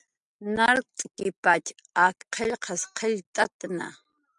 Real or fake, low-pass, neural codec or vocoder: real; 10.8 kHz; none